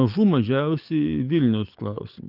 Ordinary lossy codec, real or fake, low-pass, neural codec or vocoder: Opus, 32 kbps; fake; 5.4 kHz; codec, 44.1 kHz, 7.8 kbps, DAC